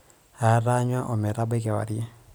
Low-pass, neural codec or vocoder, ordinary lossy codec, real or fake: none; vocoder, 44.1 kHz, 128 mel bands every 256 samples, BigVGAN v2; none; fake